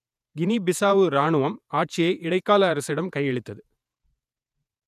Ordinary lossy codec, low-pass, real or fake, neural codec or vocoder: none; 14.4 kHz; fake; vocoder, 48 kHz, 128 mel bands, Vocos